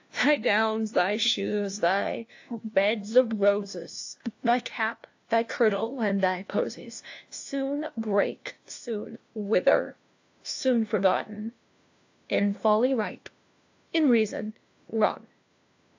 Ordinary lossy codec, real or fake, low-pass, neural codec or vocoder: AAC, 48 kbps; fake; 7.2 kHz; codec, 16 kHz, 1 kbps, FunCodec, trained on LibriTTS, 50 frames a second